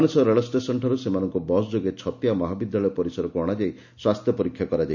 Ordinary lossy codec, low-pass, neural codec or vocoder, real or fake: none; 7.2 kHz; none; real